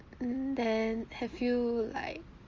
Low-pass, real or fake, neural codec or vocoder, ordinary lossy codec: 7.2 kHz; real; none; Opus, 32 kbps